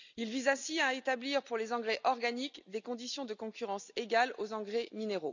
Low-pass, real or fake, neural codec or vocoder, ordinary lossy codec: 7.2 kHz; real; none; none